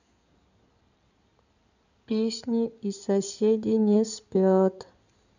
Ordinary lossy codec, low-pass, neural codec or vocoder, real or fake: none; 7.2 kHz; codec, 16 kHz in and 24 kHz out, 2.2 kbps, FireRedTTS-2 codec; fake